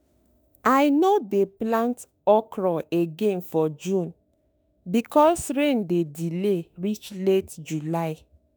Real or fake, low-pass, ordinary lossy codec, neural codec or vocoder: fake; none; none; autoencoder, 48 kHz, 32 numbers a frame, DAC-VAE, trained on Japanese speech